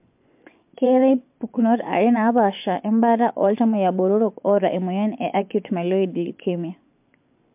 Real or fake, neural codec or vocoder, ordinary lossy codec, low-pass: fake; vocoder, 24 kHz, 100 mel bands, Vocos; MP3, 32 kbps; 3.6 kHz